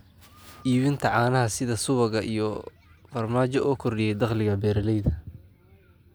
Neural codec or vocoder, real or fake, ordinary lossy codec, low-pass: none; real; none; none